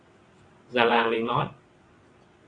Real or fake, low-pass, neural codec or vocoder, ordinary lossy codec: fake; 9.9 kHz; vocoder, 22.05 kHz, 80 mel bands, WaveNeXt; AAC, 32 kbps